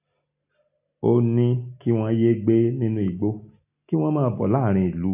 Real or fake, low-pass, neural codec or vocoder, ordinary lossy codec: real; 3.6 kHz; none; AAC, 32 kbps